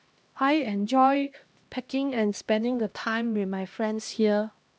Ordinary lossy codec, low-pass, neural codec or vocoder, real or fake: none; none; codec, 16 kHz, 1 kbps, X-Codec, HuBERT features, trained on LibriSpeech; fake